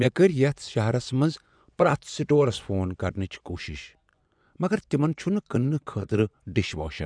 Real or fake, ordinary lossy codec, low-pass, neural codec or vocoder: fake; none; 9.9 kHz; vocoder, 24 kHz, 100 mel bands, Vocos